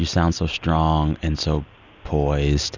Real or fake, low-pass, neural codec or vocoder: real; 7.2 kHz; none